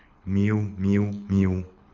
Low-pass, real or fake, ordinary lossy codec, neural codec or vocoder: 7.2 kHz; fake; none; codec, 24 kHz, 6 kbps, HILCodec